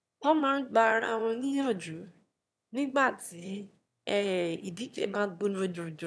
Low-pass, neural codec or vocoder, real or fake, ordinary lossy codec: none; autoencoder, 22.05 kHz, a latent of 192 numbers a frame, VITS, trained on one speaker; fake; none